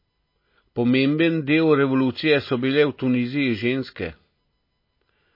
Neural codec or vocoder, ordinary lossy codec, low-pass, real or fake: none; MP3, 24 kbps; 5.4 kHz; real